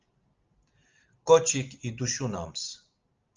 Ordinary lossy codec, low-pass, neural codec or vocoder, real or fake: Opus, 24 kbps; 7.2 kHz; none; real